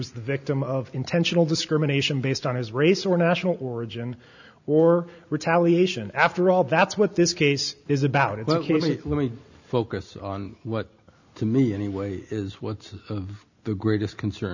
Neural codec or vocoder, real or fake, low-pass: none; real; 7.2 kHz